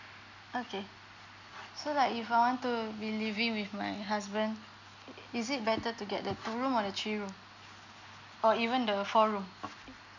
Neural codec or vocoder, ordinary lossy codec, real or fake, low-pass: none; none; real; 7.2 kHz